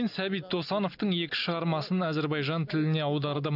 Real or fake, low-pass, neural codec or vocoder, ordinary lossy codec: real; 5.4 kHz; none; none